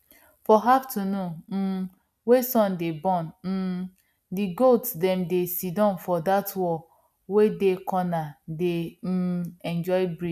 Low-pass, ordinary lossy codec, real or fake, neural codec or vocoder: 14.4 kHz; none; real; none